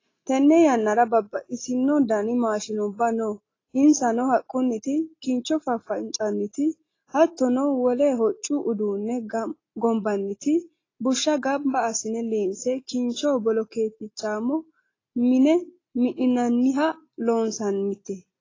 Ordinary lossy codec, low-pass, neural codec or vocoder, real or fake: AAC, 32 kbps; 7.2 kHz; none; real